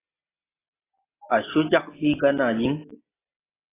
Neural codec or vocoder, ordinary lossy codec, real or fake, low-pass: none; AAC, 16 kbps; real; 3.6 kHz